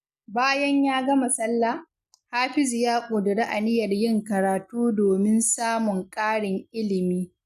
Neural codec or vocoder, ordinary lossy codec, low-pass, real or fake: none; none; 14.4 kHz; real